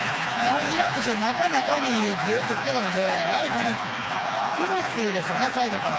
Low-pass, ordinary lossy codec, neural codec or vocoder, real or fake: none; none; codec, 16 kHz, 2 kbps, FreqCodec, smaller model; fake